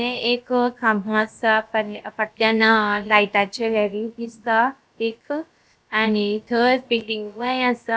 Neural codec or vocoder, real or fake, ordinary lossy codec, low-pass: codec, 16 kHz, about 1 kbps, DyCAST, with the encoder's durations; fake; none; none